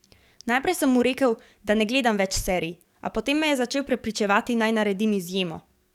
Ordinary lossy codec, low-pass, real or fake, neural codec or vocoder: none; 19.8 kHz; fake; codec, 44.1 kHz, 7.8 kbps, DAC